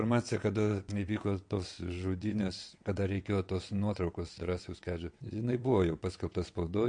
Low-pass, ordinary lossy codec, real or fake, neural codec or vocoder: 9.9 kHz; MP3, 48 kbps; fake; vocoder, 22.05 kHz, 80 mel bands, WaveNeXt